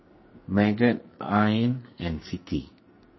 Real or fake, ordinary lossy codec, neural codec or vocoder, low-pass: fake; MP3, 24 kbps; codec, 44.1 kHz, 2.6 kbps, SNAC; 7.2 kHz